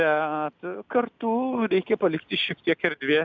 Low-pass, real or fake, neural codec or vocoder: 7.2 kHz; real; none